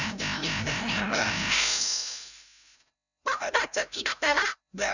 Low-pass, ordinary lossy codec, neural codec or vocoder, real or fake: 7.2 kHz; none; codec, 16 kHz, 0.5 kbps, FreqCodec, larger model; fake